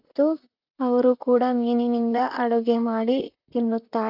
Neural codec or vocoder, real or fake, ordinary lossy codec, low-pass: vocoder, 44.1 kHz, 128 mel bands, Pupu-Vocoder; fake; none; 5.4 kHz